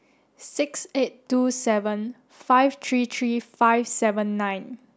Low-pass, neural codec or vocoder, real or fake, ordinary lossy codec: none; none; real; none